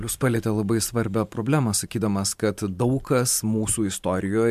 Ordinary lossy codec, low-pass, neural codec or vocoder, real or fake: MP3, 96 kbps; 14.4 kHz; vocoder, 44.1 kHz, 128 mel bands every 512 samples, BigVGAN v2; fake